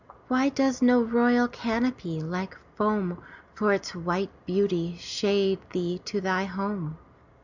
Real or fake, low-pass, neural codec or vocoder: real; 7.2 kHz; none